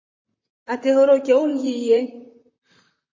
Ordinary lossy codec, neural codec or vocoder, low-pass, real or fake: MP3, 32 kbps; vocoder, 44.1 kHz, 128 mel bands, Pupu-Vocoder; 7.2 kHz; fake